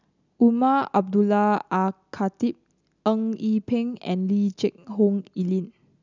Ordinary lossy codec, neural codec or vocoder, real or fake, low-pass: none; none; real; 7.2 kHz